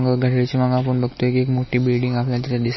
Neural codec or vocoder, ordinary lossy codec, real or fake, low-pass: none; MP3, 24 kbps; real; 7.2 kHz